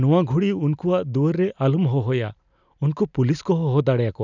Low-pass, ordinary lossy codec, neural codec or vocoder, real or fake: 7.2 kHz; none; none; real